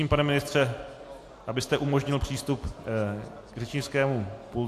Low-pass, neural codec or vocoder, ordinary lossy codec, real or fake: 14.4 kHz; none; AAC, 64 kbps; real